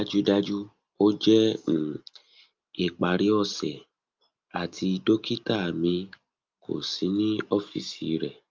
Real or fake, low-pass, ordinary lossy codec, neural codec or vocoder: real; 7.2 kHz; Opus, 24 kbps; none